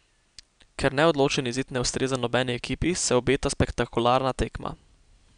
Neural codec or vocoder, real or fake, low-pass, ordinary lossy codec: none; real; 9.9 kHz; none